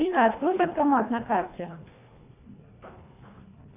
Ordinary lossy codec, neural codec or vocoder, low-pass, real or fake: AAC, 24 kbps; codec, 24 kHz, 1.5 kbps, HILCodec; 3.6 kHz; fake